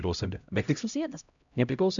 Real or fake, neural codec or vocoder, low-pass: fake; codec, 16 kHz, 0.5 kbps, X-Codec, HuBERT features, trained on LibriSpeech; 7.2 kHz